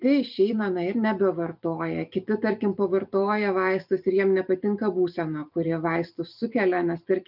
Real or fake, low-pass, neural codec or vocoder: fake; 5.4 kHz; vocoder, 44.1 kHz, 128 mel bands every 256 samples, BigVGAN v2